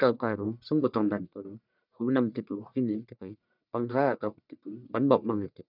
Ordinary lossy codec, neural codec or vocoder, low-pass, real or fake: none; codec, 44.1 kHz, 1.7 kbps, Pupu-Codec; 5.4 kHz; fake